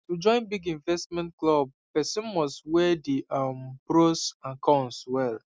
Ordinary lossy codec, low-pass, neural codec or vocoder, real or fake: none; none; none; real